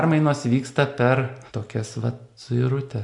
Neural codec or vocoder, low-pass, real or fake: none; 10.8 kHz; real